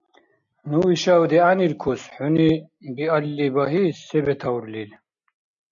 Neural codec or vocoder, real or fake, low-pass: none; real; 7.2 kHz